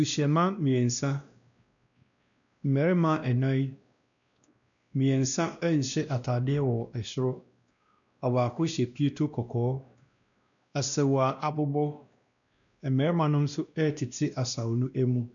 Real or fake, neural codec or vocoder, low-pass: fake; codec, 16 kHz, 1 kbps, X-Codec, WavLM features, trained on Multilingual LibriSpeech; 7.2 kHz